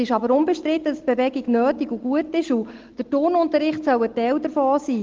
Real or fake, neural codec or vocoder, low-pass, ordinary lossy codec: real; none; 7.2 kHz; Opus, 16 kbps